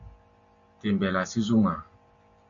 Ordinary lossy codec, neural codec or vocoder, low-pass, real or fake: AAC, 64 kbps; none; 7.2 kHz; real